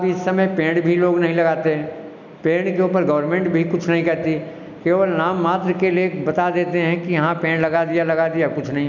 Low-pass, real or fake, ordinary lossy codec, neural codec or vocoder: 7.2 kHz; real; none; none